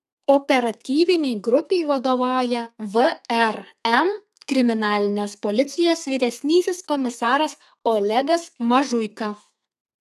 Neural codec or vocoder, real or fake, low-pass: codec, 32 kHz, 1.9 kbps, SNAC; fake; 14.4 kHz